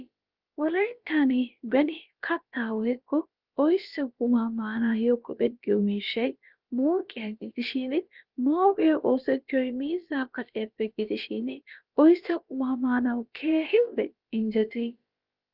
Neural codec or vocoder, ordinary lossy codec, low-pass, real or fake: codec, 16 kHz, about 1 kbps, DyCAST, with the encoder's durations; Opus, 24 kbps; 5.4 kHz; fake